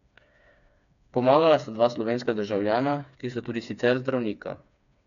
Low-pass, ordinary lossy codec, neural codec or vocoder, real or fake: 7.2 kHz; none; codec, 16 kHz, 4 kbps, FreqCodec, smaller model; fake